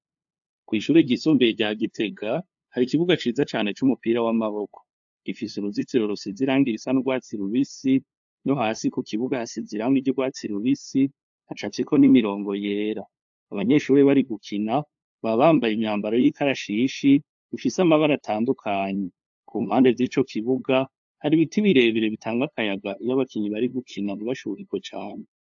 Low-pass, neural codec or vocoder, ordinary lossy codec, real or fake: 7.2 kHz; codec, 16 kHz, 2 kbps, FunCodec, trained on LibriTTS, 25 frames a second; AAC, 64 kbps; fake